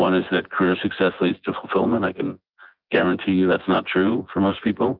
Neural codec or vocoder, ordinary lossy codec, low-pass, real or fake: vocoder, 24 kHz, 100 mel bands, Vocos; Opus, 24 kbps; 5.4 kHz; fake